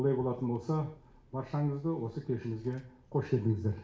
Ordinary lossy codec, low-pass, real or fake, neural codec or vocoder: none; none; real; none